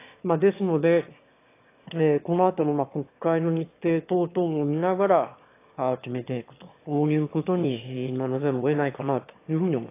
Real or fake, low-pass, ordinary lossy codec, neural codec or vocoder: fake; 3.6 kHz; AAC, 24 kbps; autoencoder, 22.05 kHz, a latent of 192 numbers a frame, VITS, trained on one speaker